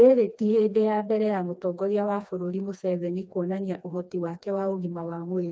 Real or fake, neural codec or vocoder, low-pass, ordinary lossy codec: fake; codec, 16 kHz, 2 kbps, FreqCodec, smaller model; none; none